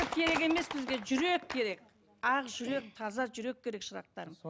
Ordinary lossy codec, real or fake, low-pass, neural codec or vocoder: none; real; none; none